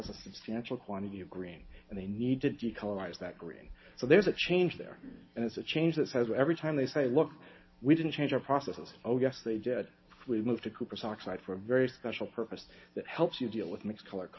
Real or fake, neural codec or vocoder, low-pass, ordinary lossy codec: real; none; 7.2 kHz; MP3, 24 kbps